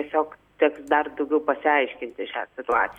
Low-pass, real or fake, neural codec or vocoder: 19.8 kHz; real; none